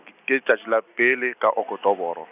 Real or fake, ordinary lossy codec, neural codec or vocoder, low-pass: real; none; none; 3.6 kHz